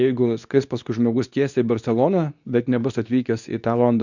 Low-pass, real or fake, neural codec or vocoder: 7.2 kHz; fake; codec, 24 kHz, 0.9 kbps, WavTokenizer, medium speech release version 2